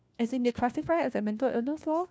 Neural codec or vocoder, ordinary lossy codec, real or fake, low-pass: codec, 16 kHz, 1 kbps, FunCodec, trained on LibriTTS, 50 frames a second; none; fake; none